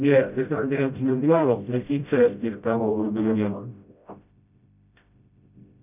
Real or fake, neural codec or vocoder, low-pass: fake; codec, 16 kHz, 0.5 kbps, FreqCodec, smaller model; 3.6 kHz